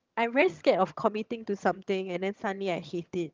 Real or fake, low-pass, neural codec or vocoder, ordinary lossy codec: fake; 7.2 kHz; vocoder, 22.05 kHz, 80 mel bands, HiFi-GAN; Opus, 24 kbps